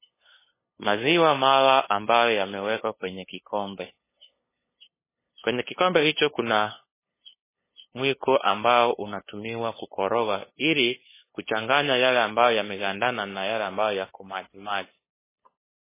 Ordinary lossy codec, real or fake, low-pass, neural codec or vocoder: MP3, 16 kbps; fake; 3.6 kHz; codec, 16 kHz, 8 kbps, FunCodec, trained on LibriTTS, 25 frames a second